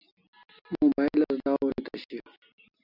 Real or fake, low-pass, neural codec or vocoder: real; 5.4 kHz; none